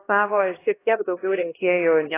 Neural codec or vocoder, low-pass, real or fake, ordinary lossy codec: codec, 16 kHz, 1 kbps, X-Codec, HuBERT features, trained on LibriSpeech; 3.6 kHz; fake; AAC, 16 kbps